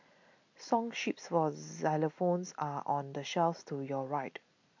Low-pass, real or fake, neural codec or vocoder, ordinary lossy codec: 7.2 kHz; real; none; MP3, 48 kbps